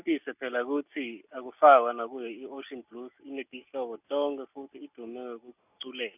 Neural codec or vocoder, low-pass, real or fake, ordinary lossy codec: none; 3.6 kHz; real; none